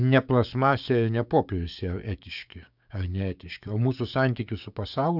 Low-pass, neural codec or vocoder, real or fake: 5.4 kHz; codec, 16 kHz, 6 kbps, DAC; fake